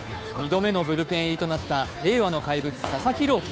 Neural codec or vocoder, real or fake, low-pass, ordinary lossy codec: codec, 16 kHz, 2 kbps, FunCodec, trained on Chinese and English, 25 frames a second; fake; none; none